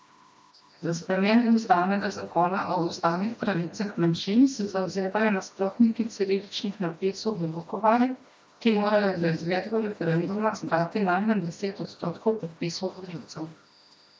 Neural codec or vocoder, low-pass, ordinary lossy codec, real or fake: codec, 16 kHz, 1 kbps, FreqCodec, smaller model; none; none; fake